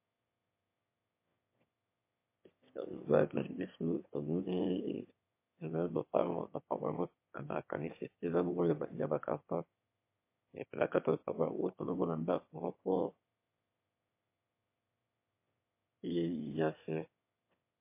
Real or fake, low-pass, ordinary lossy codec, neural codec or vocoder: fake; 3.6 kHz; MP3, 24 kbps; autoencoder, 22.05 kHz, a latent of 192 numbers a frame, VITS, trained on one speaker